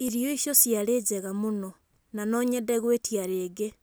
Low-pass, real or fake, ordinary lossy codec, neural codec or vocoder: none; real; none; none